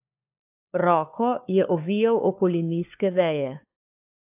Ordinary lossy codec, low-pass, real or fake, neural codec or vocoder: AAC, 32 kbps; 3.6 kHz; fake; codec, 16 kHz, 4 kbps, FunCodec, trained on LibriTTS, 50 frames a second